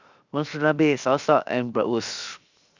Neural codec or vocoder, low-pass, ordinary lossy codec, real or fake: codec, 16 kHz, 0.7 kbps, FocalCodec; 7.2 kHz; Opus, 64 kbps; fake